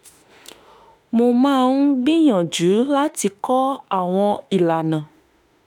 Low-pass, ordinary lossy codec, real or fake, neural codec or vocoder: none; none; fake; autoencoder, 48 kHz, 32 numbers a frame, DAC-VAE, trained on Japanese speech